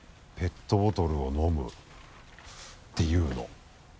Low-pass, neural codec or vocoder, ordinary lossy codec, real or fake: none; none; none; real